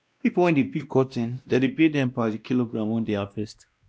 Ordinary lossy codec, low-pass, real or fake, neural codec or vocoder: none; none; fake; codec, 16 kHz, 1 kbps, X-Codec, WavLM features, trained on Multilingual LibriSpeech